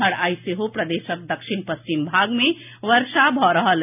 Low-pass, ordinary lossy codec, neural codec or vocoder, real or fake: 3.6 kHz; none; none; real